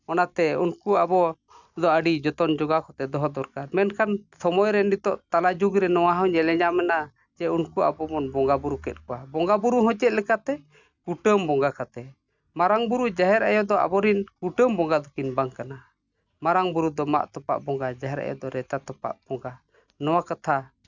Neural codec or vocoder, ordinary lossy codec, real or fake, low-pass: none; none; real; 7.2 kHz